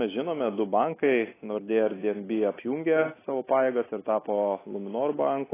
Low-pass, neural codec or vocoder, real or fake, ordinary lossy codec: 3.6 kHz; none; real; AAC, 16 kbps